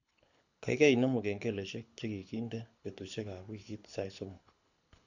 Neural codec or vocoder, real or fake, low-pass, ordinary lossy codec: codec, 24 kHz, 6 kbps, HILCodec; fake; 7.2 kHz; none